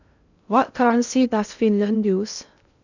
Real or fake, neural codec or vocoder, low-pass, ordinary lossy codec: fake; codec, 16 kHz in and 24 kHz out, 0.6 kbps, FocalCodec, streaming, 2048 codes; 7.2 kHz; none